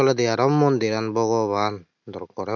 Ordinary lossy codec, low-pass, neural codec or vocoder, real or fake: none; 7.2 kHz; none; real